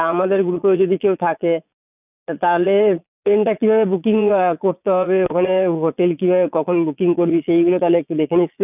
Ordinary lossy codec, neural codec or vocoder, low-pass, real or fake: none; vocoder, 22.05 kHz, 80 mel bands, Vocos; 3.6 kHz; fake